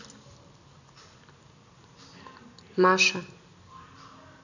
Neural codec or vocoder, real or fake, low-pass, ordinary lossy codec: none; real; 7.2 kHz; none